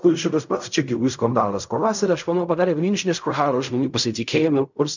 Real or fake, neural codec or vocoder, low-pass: fake; codec, 16 kHz in and 24 kHz out, 0.4 kbps, LongCat-Audio-Codec, fine tuned four codebook decoder; 7.2 kHz